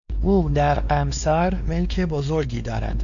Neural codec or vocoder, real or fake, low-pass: codec, 16 kHz, 2 kbps, X-Codec, WavLM features, trained on Multilingual LibriSpeech; fake; 7.2 kHz